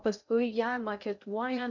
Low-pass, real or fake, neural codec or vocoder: 7.2 kHz; fake; codec, 16 kHz in and 24 kHz out, 0.6 kbps, FocalCodec, streaming, 2048 codes